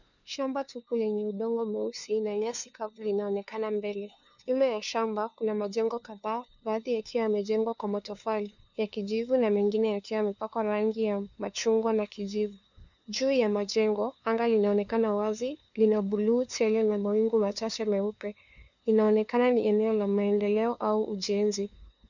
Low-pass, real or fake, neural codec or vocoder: 7.2 kHz; fake; codec, 16 kHz, 2 kbps, FunCodec, trained on LibriTTS, 25 frames a second